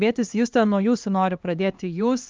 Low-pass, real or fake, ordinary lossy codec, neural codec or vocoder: 7.2 kHz; fake; Opus, 24 kbps; codec, 16 kHz, 2 kbps, X-Codec, HuBERT features, trained on LibriSpeech